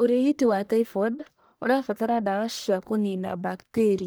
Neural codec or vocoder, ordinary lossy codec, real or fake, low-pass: codec, 44.1 kHz, 1.7 kbps, Pupu-Codec; none; fake; none